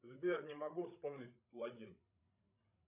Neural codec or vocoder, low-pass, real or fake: codec, 16 kHz, 8 kbps, FreqCodec, larger model; 3.6 kHz; fake